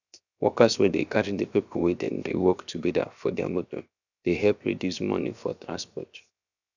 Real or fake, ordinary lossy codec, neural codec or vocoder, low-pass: fake; none; codec, 16 kHz, 0.7 kbps, FocalCodec; 7.2 kHz